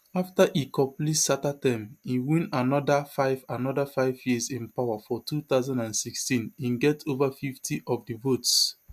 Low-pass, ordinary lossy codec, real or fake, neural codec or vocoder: 14.4 kHz; MP3, 96 kbps; real; none